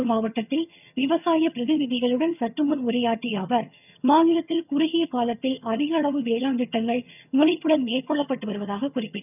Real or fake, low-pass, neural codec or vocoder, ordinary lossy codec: fake; 3.6 kHz; vocoder, 22.05 kHz, 80 mel bands, HiFi-GAN; none